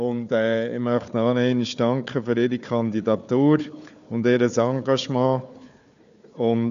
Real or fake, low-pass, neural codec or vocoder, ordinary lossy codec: fake; 7.2 kHz; codec, 16 kHz, 4 kbps, FunCodec, trained on Chinese and English, 50 frames a second; AAC, 96 kbps